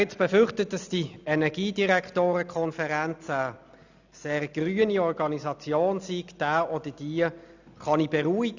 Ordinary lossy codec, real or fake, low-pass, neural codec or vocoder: none; real; 7.2 kHz; none